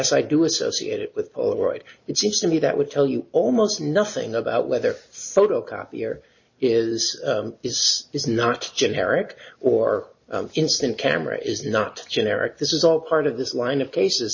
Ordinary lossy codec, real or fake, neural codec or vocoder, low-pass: MP3, 32 kbps; real; none; 7.2 kHz